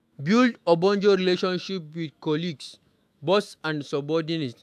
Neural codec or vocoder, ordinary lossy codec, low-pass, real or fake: autoencoder, 48 kHz, 128 numbers a frame, DAC-VAE, trained on Japanese speech; AAC, 96 kbps; 14.4 kHz; fake